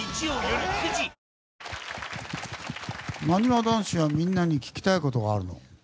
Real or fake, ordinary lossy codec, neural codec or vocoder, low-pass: real; none; none; none